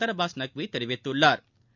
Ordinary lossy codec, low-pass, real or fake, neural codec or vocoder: none; 7.2 kHz; real; none